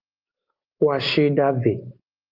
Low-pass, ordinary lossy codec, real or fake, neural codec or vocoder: 5.4 kHz; Opus, 32 kbps; real; none